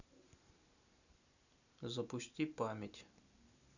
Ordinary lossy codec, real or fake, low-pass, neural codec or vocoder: none; real; 7.2 kHz; none